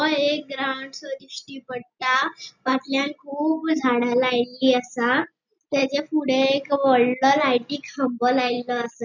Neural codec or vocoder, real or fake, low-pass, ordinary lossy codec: none; real; 7.2 kHz; none